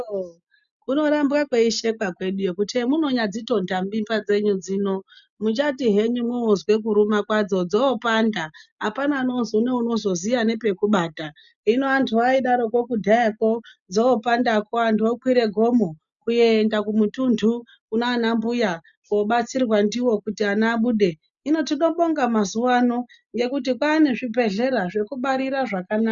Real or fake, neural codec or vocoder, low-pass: real; none; 7.2 kHz